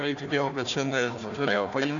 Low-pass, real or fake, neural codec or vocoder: 7.2 kHz; fake; codec, 16 kHz, 1 kbps, FunCodec, trained on Chinese and English, 50 frames a second